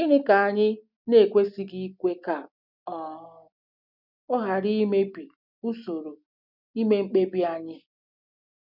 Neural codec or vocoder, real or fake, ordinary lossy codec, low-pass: none; real; none; 5.4 kHz